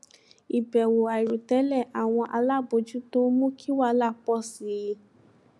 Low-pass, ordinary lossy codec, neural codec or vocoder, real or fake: none; none; none; real